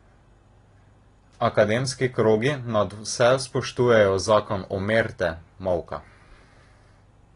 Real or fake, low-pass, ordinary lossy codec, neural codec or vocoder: real; 10.8 kHz; AAC, 32 kbps; none